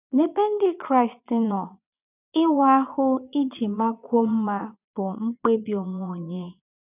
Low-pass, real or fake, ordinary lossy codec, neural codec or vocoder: 3.6 kHz; fake; none; vocoder, 22.05 kHz, 80 mel bands, Vocos